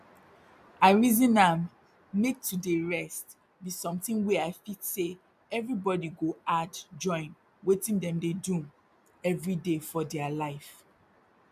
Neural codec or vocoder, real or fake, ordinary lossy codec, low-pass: none; real; MP3, 96 kbps; 14.4 kHz